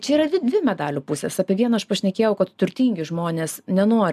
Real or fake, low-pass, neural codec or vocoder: real; 14.4 kHz; none